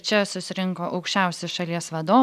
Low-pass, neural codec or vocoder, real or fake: 14.4 kHz; none; real